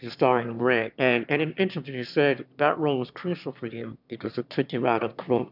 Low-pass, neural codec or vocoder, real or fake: 5.4 kHz; autoencoder, 22.05 kHz, a latent of 192 numbers a frame, VITS, trained on one speaker; fake